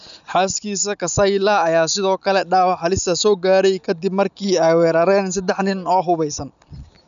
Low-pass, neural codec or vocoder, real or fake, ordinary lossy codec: 7.2 kHz; none; real; none